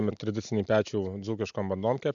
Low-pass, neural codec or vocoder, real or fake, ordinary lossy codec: 7.2 kHz; none; real; MP3, 96 kbps